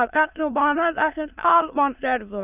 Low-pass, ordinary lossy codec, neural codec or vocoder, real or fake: 3.6 kHz; none; autoencoder, 22.05 kHz, a latent of 192 numbers a frame, VITS, trained on many speakers; fake